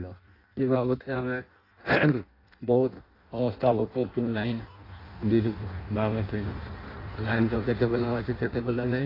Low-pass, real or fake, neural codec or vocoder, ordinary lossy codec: 5.4 kHz; fake; codec, 16 kHz in and 24 kHz out, 0.6 kbps, FireRedTTS-2 codec; AAC, 24 kbps